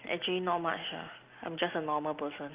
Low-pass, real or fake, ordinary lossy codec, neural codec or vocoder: 3.6 kHz; real; Opus, 32 kbps; none